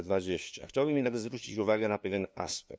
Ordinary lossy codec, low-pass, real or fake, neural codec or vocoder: none; none; fake; codec, 16 kHz, 2 kbps, FunCodec, trained on LibriTTS, 25 frames a second